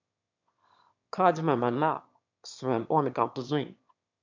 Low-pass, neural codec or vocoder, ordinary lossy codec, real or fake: 7.2 kHz; autoencoder, 22.05 kHz, a latent of 192 numbers a frame, VITS, trained on one speaker; MP3, 64 kbps; fake